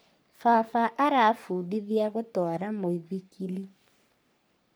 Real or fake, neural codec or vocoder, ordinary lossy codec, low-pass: fake; codec, 44.1 kHz, 3.4 kbps, Pupu-Codec; none; none